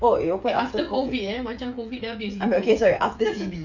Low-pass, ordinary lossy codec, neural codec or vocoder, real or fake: 7.2 kHz; none; codec, 16 kHz, 8 kbps, FreqCodec, smaller model; fake